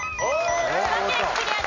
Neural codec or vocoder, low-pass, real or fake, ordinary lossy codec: none; 7.2 kHz; real; none